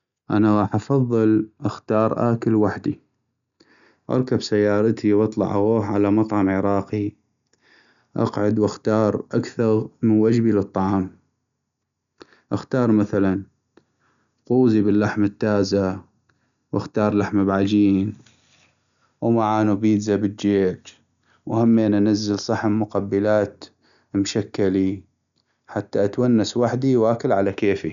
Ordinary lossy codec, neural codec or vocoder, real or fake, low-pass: MP3, 96 kbps; none; real; 7.2 kHz